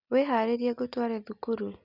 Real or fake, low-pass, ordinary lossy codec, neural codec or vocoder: real; 5.4 kHz; Opus, 64 kbps; none